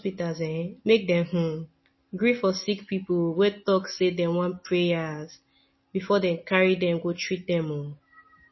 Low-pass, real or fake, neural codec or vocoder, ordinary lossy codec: 7.2 kHz; real; none; MP3, 24 kbps